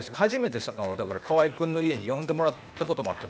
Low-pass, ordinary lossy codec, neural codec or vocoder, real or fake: none; none; codec, 16 kHz, 0.8 kbps, ZipCodec; fake